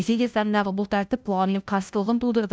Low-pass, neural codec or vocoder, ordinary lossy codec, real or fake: none; codec, 16 kHz, 0.5 kbps, FunCodec, trained on LibriTTS, 25 frames a second; none; fake